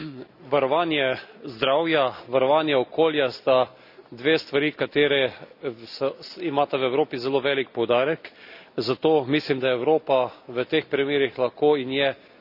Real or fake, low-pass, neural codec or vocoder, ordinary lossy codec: real; 5.4 kHz; none; none